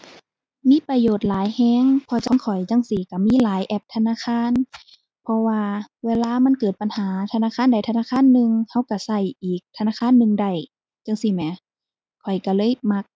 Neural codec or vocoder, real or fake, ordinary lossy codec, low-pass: none; real; none; none